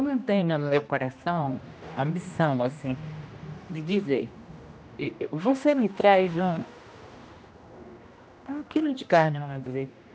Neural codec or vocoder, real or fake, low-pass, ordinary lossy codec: codec, 16 kHz, 1 kbps, X-Codec, HuBERT features, trained on general audio; fake; none; none